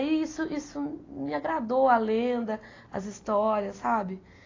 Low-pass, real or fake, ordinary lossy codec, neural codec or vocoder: 7.2 kHz; real; AAC, 32 kbps; none